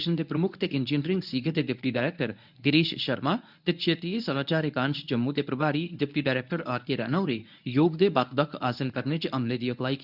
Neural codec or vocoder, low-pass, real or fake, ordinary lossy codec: codec, 24 kHz, 0.9 kbps, WavTokenizer, medium speech release version 1; 5.4 kHz; fake; none